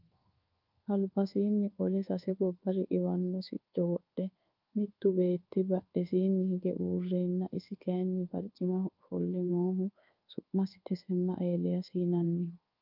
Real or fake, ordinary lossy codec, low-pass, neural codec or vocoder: fake; Opus, 24 kbps; 5.4 kHz; codec, 24 kHz, 1.2 kbps, DualCodec